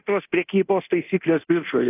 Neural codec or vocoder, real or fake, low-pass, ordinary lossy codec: codec, 24 kHz, 0.9 kbps, DualCodec; fake; 3.6 kHz; AAC, 32 kbps